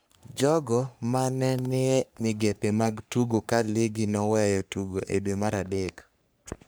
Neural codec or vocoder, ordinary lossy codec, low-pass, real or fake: codec, 44.1 kHz, 3.4 kbps, Pupu-Codec; none; none; fake